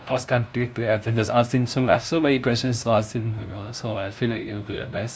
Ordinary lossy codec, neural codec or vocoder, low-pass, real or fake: none; codec, 16 kHz, 0.5 kbps, FunCodec, trained on LibriTTS, 25 frames a second; none; fake